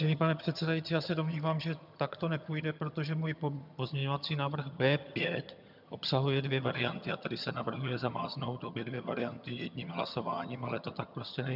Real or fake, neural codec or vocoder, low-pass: fake; vocoder, 22.05 kHz, 80 mel bands, HiFi-GAN; 5.4 kHz